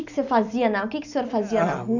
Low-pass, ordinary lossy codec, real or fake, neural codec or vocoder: 7.2 kHz; none; real; none